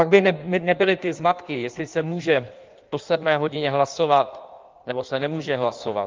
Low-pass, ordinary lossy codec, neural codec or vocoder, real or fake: 7.2 kHz; Opus, 32 kbps; codec, 16 kHz in and 24 kHz out, 1.1 kbps, FireRedTTS-2 codec; fake